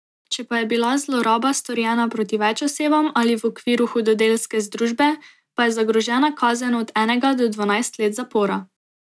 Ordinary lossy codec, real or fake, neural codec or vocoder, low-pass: none; real; none; none